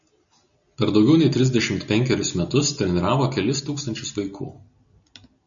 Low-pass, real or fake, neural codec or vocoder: 7.2 kHz; real; none